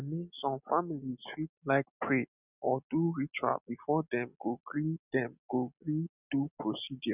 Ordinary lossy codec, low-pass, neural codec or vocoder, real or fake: none; 3.6 kHz; none; real